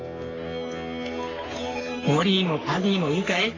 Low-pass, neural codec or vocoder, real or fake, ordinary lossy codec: 7.2 kHz; codec, 44.1 kHz, 3.4 kbps, Pupu-Codec; fake; AAC, 32 kbps